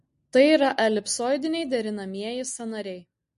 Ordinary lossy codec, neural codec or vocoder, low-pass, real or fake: MP3, 48 kbps; none; 14.4 kHz; real